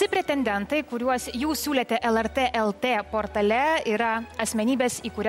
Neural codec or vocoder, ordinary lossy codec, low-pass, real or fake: none; MP3, 64 kbps; 19.8 kHz; real